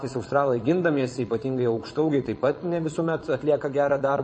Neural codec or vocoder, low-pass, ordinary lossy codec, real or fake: vocoder, 22.05 kHz, 80 mel bands, Vocos; 9.9 kHz; MP3, 32 kbps; fake